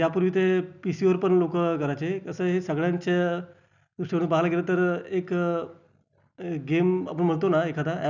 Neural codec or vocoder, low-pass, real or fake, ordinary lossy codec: none; 7.2 kHz; real; none